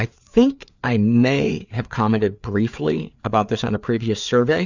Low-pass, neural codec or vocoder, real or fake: 7.2 kHz; codec, 16 kHz, 4 kbps, FreqCodec, larger model; fake